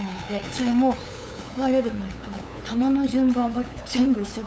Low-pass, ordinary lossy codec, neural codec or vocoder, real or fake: none; none; codec, 16 kHz, 8 kbps, FunCodec, trained on LibriTTS, 25 frames a second; fake